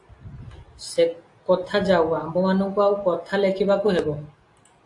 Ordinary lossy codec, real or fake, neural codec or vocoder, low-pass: AAC, 48 kbps; real; none; 10.8 kHz